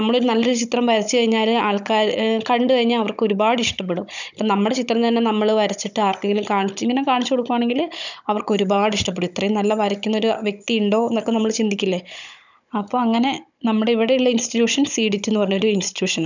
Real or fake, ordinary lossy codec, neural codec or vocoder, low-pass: fake; none; codec, 16 kHz, 16 kbps, FunCodec, trained on Chinese and English, 50 frames a second; 7.2 kHz